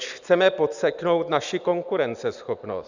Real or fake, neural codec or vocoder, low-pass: real; none; 7.2 kHz